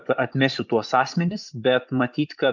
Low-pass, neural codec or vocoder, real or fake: 7.2 kHz; vocoder, 24 kHz, 100 mel bands, Vocos; fake